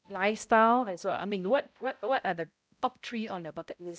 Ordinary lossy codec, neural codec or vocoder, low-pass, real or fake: none; codec, 16 kHz, 0.5 kbps, X-Codec, HuBERT features, trained on balanced general audio; none; fake